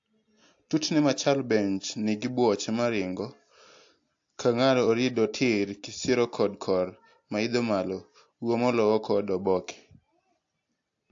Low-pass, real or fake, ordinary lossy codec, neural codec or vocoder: 7.2 kHz; real; AAC, 48 kbps; none